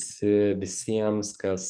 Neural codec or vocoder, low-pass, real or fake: codec, 44.1 kHz, 7.8 kbps, DAC; 9.9 kHz; fake